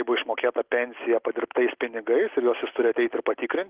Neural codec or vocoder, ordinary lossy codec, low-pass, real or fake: none; Opus, 16 kbps; 3.6 kHz; real